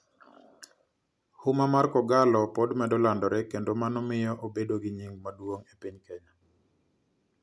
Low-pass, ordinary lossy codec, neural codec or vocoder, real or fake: none; none; none; real